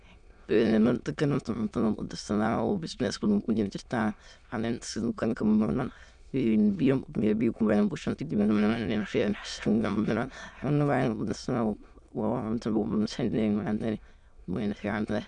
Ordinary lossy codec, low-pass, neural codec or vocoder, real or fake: none; 9.9 kHz; autoencoder, 22.05 kHz, a latent of 192 numbers a frame, VITS, trained on many speakers; fake